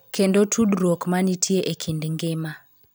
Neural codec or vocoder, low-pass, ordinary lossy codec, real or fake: vocoder, 44.1 kHz, 128 mel bands every 512 samples, BigVGAN v2; none; none; fake